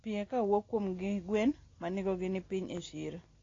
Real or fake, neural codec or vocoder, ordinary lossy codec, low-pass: real; none; AAC, 32 kbps; 7.2 kHz